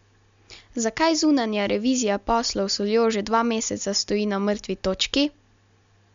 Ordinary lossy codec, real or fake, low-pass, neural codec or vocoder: MP3, 64 kbps; real; 7.2 kHz; none